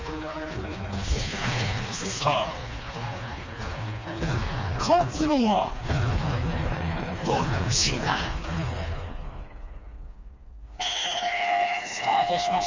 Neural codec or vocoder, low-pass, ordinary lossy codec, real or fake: codec, 16 kHz, 2 kbps, FreqCodec, smaller model; 7.2 kHz; MP3, 48 kbps; fake